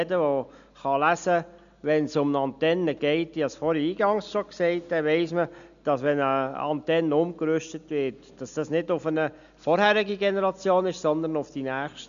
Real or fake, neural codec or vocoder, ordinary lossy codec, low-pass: real; none; none; 7.2 kHz